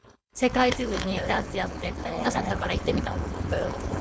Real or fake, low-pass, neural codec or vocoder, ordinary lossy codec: fake; none; codec, 16 kHz, 4.8 kbps, FACodec; none